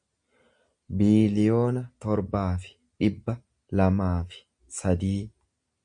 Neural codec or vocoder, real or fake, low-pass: none; real; 9.9 kHz